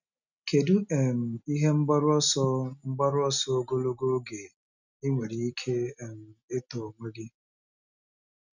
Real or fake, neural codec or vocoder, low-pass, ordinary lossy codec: real; none; 7.2 kHz; none